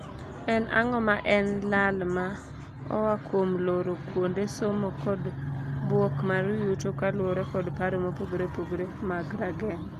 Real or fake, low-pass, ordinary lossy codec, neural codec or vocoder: real; 14.4 kHz; Opus, 32 kbps; none